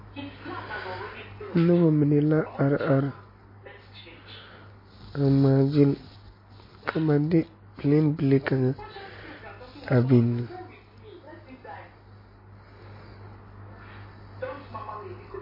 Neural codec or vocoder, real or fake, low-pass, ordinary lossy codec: none; real; 5.4 kHz; MP3, 24 kbps